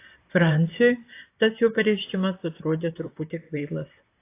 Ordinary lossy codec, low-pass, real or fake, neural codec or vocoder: AAC, 24 kbps; 3.6 kHz; fake; vocoder, 44.1 kHz, 128 mel bands, Pupu-Vocoder